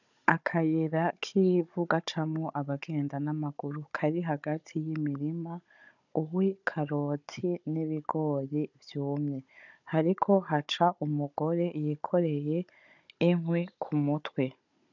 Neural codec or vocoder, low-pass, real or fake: codec, 16 kHz, 16 kbps, FunCodec, trained on Chinese and English, 50 frames a second; 7.2 kHz; fake